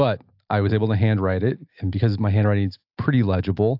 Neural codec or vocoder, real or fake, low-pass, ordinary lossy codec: none; real; 5.4 kHz; AAC, 48 kbps